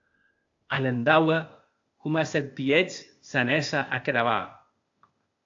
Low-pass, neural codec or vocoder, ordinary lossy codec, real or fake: 7.2 kHz; codec, 16 kHz, 0.8 kbps, ZipCodec; AAC, 48 kbps; fake